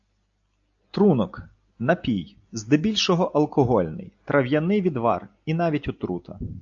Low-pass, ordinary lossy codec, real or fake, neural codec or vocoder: 7.2 kHz; AAC, 64 kbps; real; none